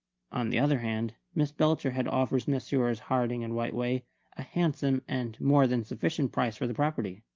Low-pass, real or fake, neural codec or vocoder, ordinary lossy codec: 7.2 kHz; real; none; Opus, 24 kbps